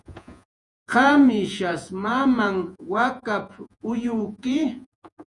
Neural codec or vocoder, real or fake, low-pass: vocoder, 48 kHz, 128 mel bands, Vocos; fake; 10.8 kHz